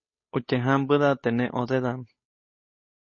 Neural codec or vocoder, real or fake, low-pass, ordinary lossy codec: codec, 16 kHz, 8 kbps, FunCodec, trained on Chinese and English, 25 frames a second; fake; 7.2 kHz; MP3, 32 kbps